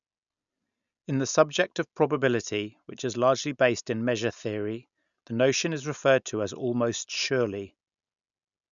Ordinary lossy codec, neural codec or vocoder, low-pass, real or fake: none; none; 7.2 kHz; real